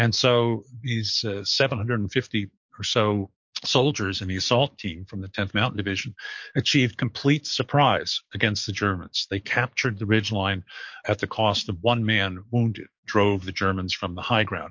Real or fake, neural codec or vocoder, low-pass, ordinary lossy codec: fake; codec, 44.1 kHz, 7.8 kbps, DAC; 7.2 kHz; MP3, 48 kbps